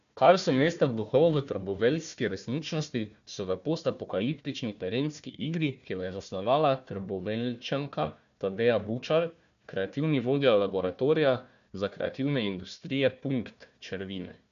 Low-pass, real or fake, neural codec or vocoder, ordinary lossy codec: 7.2 kHz; fake; codec, 16 kHz, 1 kbps, FunCodec, trained on Chinese and English, 50 frames a second; none